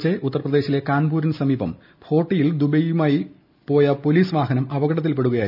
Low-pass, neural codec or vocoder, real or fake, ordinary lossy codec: 5.4 kHz; none; real; none